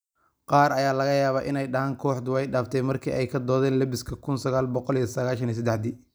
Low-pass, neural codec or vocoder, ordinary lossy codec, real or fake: none; none; none; real